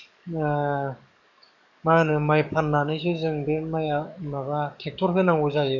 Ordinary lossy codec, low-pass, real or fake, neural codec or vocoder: none; 7.2 kHz; fake; codec, 44.1 kHz, 7.8 kbps, DAC